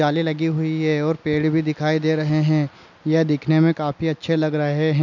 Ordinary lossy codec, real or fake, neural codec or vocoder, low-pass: none; real; none; 7.2 kHz